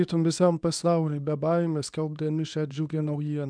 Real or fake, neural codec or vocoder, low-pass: fake; codec, 24 kHz, 0.9 kbps, WavTokenizer, medium speech release version 2; 9.9 kHz